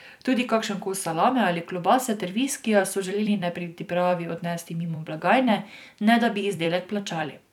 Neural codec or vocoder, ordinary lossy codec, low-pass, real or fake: vocoder, 44.1 kHz, 128 mel bands every 256 samples, BigVGAN v2; none; 19.8 kHz; fake